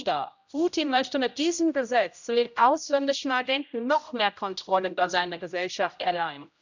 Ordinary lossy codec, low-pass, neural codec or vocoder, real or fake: none; 7.2 kHz; codec, 16 kHz, 0.5 kbps, X-Codec, HuBERT features, trained on general audio; fake